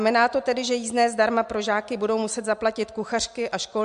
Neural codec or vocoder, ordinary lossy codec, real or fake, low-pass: none; MP3, 64 kbps; real; 10.8 kHz